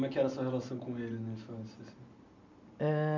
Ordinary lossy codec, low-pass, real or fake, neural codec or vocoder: MP3, 48 kbps; 7.2 kHz; real; none